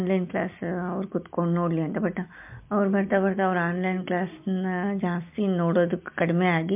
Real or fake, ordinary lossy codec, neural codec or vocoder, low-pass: real; MP3, 32 kbps; none; 3.6 kHz